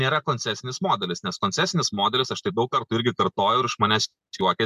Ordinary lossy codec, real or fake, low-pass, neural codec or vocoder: MP3, 96 kbps; real; 14.4 kHz; none